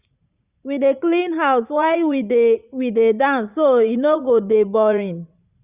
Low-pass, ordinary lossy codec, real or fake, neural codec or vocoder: 3.6 kHz; Opus, 64 kbps; fake; vocoder, 44.1 kHz, 80 mel bands, Vocos